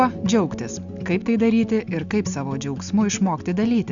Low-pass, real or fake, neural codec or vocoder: 7.2 kHz; real; none